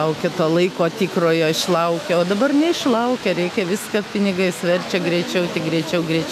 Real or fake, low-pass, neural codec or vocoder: fake; 14.4 kHz; autoencoder, 48 kHz, 128 numbers a frame, DAC-VAE, trained on Japanese speech